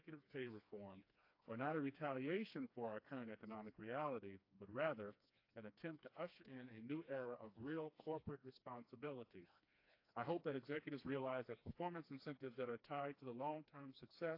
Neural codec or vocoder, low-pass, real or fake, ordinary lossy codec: codec, 16 kHz, 2 kbps, FreqCodec, smaller model; 5.4 kHz; fake; AAC, 32 kbps